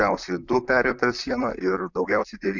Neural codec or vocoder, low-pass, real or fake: vocoder, 44.1 kHz, 128 mel bands, Pupu-Vocoder; 7.2 kHz; fake